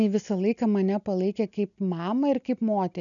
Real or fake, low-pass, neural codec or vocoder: real; 7.2 kHz; none